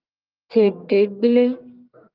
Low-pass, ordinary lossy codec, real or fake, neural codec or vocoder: 5.4 kHz; Opus, 32 kbps; fake; codec, 44.1 kHz, 1.7 kbps, Pupu-Codec